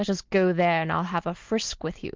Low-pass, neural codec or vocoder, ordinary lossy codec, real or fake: 7.2 kHz; none; Opus, 32 kbps; real